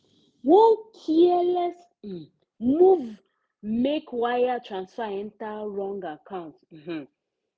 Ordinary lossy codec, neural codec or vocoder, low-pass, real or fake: none; none; none; real